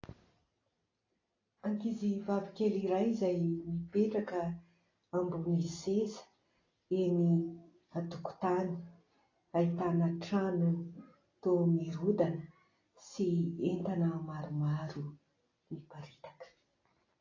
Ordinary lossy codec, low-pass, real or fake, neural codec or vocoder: AAC, 32 kbps; 7.2 kHz; real; none